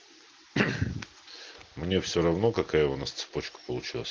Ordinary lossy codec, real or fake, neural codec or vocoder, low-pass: Opus, 16 kbps; real; none; 7.2 kHz